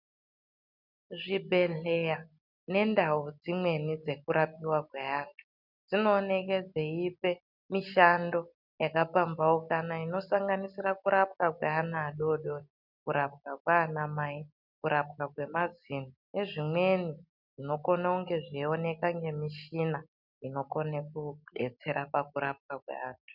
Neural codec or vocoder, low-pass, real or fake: none; 5.4 kHz; real